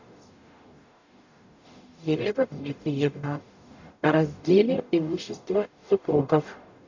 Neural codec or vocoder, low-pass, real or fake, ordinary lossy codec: codec, 44.1 kHz, 0.9 kbps, DAC; 7.2 kHz; fake; none